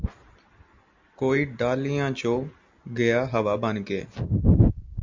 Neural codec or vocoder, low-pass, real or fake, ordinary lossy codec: none; 7.2 kHz; real; MP3, 64 kbps